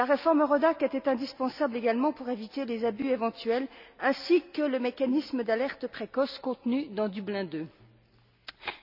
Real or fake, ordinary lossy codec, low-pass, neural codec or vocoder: real; none; 5.4 kHz; none